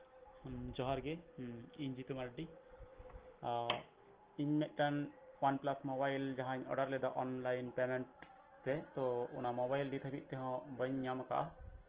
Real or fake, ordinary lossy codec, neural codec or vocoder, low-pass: real; Opus, 16 kbps; none; 3.6 kHz